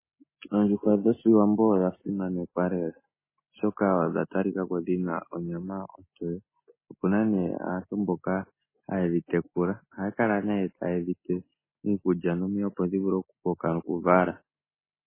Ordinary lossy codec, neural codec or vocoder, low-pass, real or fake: MP3, 16 kbps; none; 3.6 kHz; real